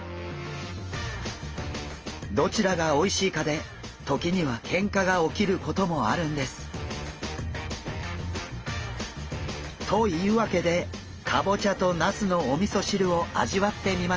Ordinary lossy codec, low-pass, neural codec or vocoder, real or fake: Opus, 24 kbps; 7.2 kHz; none; real